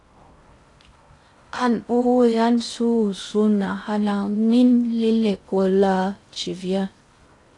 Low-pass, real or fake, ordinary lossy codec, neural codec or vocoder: 10.8 kHz; fake; AAC, 48 kbps; codec, 16 kHz in and 24 kHz out, 0.6 kbps, FocalCodec, streaming, 4096 codes